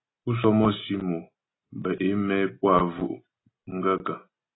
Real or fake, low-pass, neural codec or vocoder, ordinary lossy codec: real; 7.2 kHz; none; AAC, 16 kbps